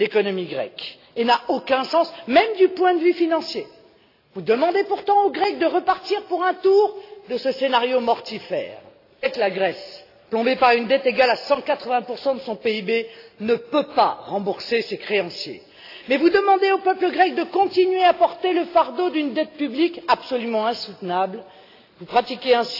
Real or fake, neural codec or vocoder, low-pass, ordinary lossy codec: real; none; 5.4 kHz; AAC, 32 kbps